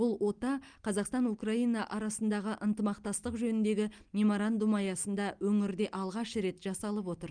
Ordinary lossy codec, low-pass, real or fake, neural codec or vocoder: Opus, 32 kbps; 9.9 kHz; real; none